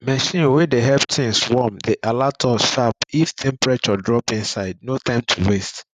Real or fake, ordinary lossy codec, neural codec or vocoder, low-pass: fake; none; vocoder, 44.1 kHz, 128 mel bands every 512 samples, BigVGAN v2; 14.4 kHz